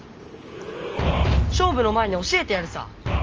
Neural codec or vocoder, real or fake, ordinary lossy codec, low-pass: none; real; Opus, 24 kbps; 7.2 kHz